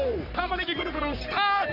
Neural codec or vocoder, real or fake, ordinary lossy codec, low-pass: codec, 44.1 kHz, 3.4 kbps, Pupu-Codec; fake; none; 5.4 kHz